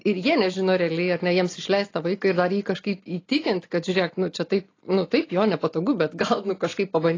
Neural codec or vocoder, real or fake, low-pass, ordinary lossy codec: none; real; 7.2 kHz; AAC, 32 kbps